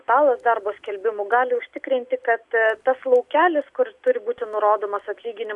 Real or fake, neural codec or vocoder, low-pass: real; none; 10.8 kHz